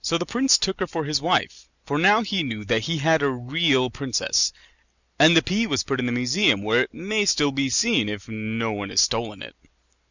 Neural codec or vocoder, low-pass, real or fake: none; 7.2 kHz; real